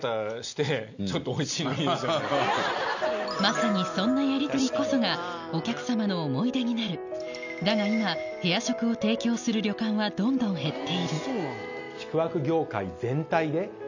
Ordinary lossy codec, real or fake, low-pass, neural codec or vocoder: none; real; 7.2 kHz; none